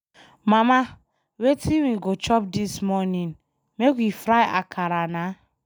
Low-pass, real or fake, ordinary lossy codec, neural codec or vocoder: none; real; none; none